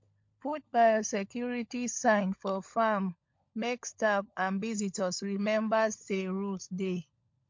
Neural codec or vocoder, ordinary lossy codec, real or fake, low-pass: codec, 16 kHz, 16 kbps, FunCodec, trained on LibriTTS, 50 frames a second; MP3, 48 kbps; fake; 7.2 kHz